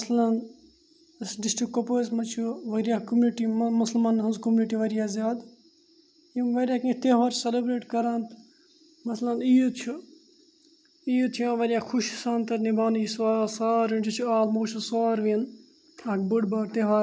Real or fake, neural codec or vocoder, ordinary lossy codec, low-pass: real; none; none; none